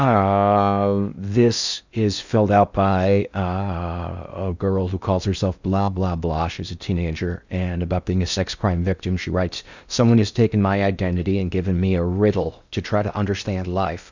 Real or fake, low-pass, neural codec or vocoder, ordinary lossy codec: fake; 7.2 kHz; codec, 16 kHz in and 24 kHz out, 0.6 kbps, FocalCodec, streaming, 4096 codes; Opus, 64 kbps